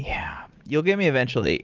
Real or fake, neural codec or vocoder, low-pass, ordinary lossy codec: real; none; 7.2 kHz; Opus, 32 kbps